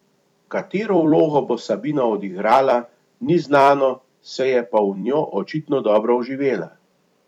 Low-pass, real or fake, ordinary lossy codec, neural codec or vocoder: 19.8 kHz; fake; none; vocoder, 44.1 kHz, 128 mel bands every 256 samples, BigVGAN v2